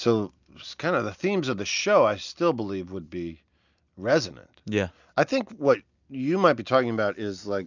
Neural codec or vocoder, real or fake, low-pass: none; real; 7.2 kHz